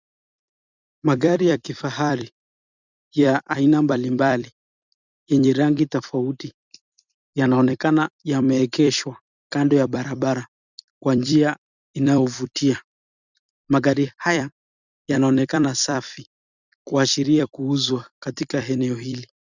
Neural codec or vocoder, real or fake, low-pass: vocoder, 44.1 kHz, 128 mel bands every 256 samples, BigVGAN v2; fake; 7.2 kHz